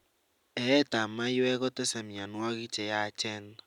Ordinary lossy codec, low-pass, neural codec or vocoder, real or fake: none; 19.8 kHz; none; real